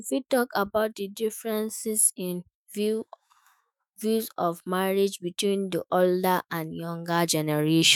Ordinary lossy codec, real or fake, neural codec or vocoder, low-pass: none; fake; autoencoder, 48 kHz, 128 numbers a frame, DAC-VAE, trained on Japanese speech; none